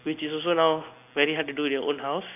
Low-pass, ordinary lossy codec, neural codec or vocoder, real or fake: 3.6 kHz; none; none; real